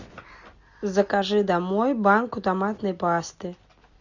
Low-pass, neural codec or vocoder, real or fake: 7.2 kHz; none; real